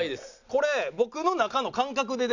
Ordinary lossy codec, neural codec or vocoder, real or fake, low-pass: none; none; real; 7.2 kHz